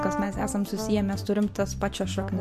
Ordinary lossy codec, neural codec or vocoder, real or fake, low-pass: MP3, 64 kbps; none; real; 14.4 kHz